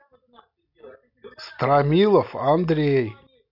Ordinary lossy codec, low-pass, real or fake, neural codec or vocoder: none; 5.4 kHz; real; none